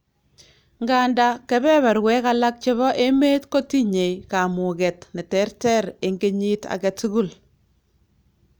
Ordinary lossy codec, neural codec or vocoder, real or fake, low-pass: none; none; real; none